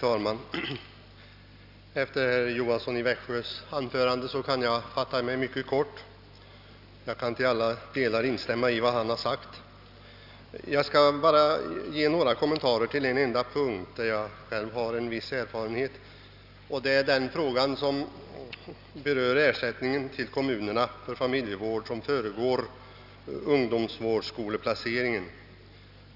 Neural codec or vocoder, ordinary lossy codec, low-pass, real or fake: none; none; 5.4 kHz; real